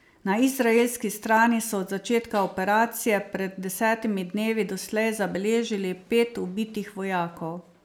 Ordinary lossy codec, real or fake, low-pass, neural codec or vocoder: none; real; none; none